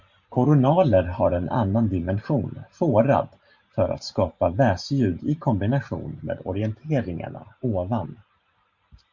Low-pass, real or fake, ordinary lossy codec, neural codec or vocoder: 7.2 kHz; fake; Opus, 64 kbps; vocoder, 44.1 kHz, 128 mel bands every 512 samples, BigVGAN v2